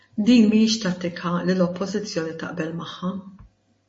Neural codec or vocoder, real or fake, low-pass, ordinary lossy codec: none; real; 10.8 kHz; MP3, 32 kbps